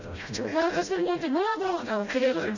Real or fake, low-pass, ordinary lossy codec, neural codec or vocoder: fake; 7.2 kHz; none; codec, 16 kHz, 0.5 kbps, FreqCodec, smaller model